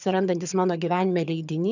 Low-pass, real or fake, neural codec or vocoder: 7.2 kHz; fake; vocoder, 22.05 kHz, 80 mel bands, HiFi-GAN